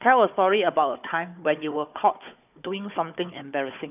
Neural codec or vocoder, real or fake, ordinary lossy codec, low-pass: codec, 16 kHz, 4 kbps, FunCodec, trained on Chinese and English, 50 frames a second; fake; none; 3.6 kHz